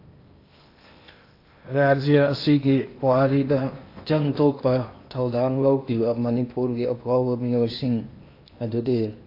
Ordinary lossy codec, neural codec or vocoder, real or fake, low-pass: AAC, 32 kbps; codec, 16 kHz in and 24 kHz out, 0.8 kbps, FocalCodec, streaming, 65536 codes; fake; 5.4 kHz